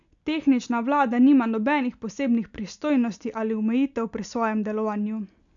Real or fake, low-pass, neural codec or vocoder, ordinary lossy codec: real; 7.2 kHz; none; none